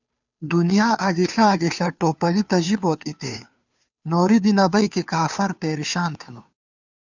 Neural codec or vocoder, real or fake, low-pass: codec, 16 kHz, 2 kbps, FunCodec, trained on Chinese and English, 25 frames a second; fake; 7.2 kHz